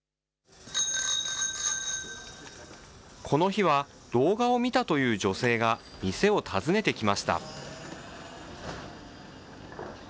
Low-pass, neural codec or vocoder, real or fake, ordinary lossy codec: none; none; real; none